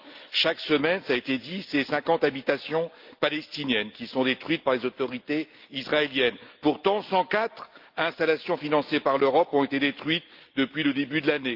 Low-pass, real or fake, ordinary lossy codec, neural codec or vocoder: 5.4 kHz; real; Opus, 24 kbps; none